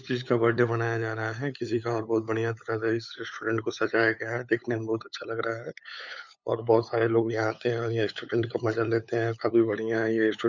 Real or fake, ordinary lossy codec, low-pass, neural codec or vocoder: fake; none; 7.2 kHz; codec, 16 kHz, 8 kbps, FunCodec, trained on LibriTTS, 25 frames a second